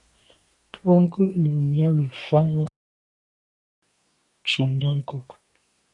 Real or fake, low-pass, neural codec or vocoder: fake; 10.8 kHz; codec, 24 kHz, 1 kbps, SNAC